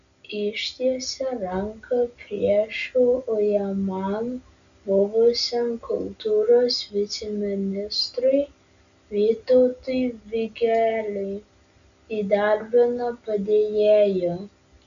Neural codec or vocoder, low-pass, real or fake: none; 7.2 kHz; real